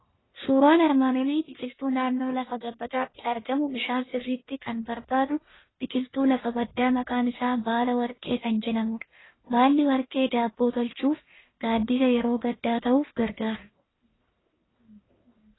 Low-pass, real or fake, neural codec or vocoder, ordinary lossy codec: 7.2 kHz; fake; codec, 44.1 kHz, 1.7 kbps, Pupu-Codec; AAC, 16 kbps